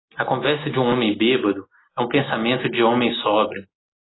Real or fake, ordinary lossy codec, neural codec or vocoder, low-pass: real; AAC, 16 kbps; none; 7.2 kHz